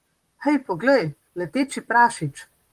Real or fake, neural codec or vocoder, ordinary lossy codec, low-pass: fake; vocoder, 44.1 kHz, 128 mel bands, Pupu-Vocoder; Opus, 24 kbps; 14.4 kHz